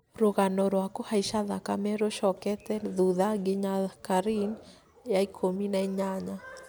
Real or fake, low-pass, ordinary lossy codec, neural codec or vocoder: real; none; none; none